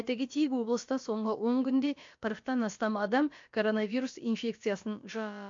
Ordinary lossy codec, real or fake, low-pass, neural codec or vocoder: MP3, 48 kbps; fake; 7.2 kHz; codec, 16 kHz, about 1 kbps, DyCAST, with the encoder's durations